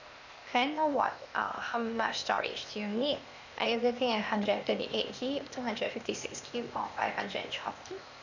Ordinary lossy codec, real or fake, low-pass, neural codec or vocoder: none; fake; 7.2 kHz; codec, 16 kHz, 0.8 kbps, ZipCodec